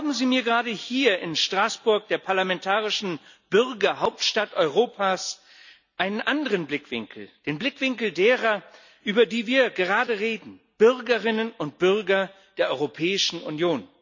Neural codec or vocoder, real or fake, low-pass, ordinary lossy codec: none; real; 7.2 kHz; none